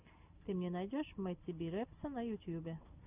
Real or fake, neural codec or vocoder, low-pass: real; none; 3.6 kHz